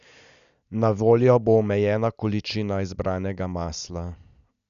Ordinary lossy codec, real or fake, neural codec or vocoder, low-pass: none; real; none; 7.2 kHz